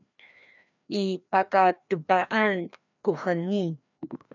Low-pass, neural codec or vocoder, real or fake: 7.2 kHz; codec, 16 kHz, 1 kbps, FreqCodec, larger model; fake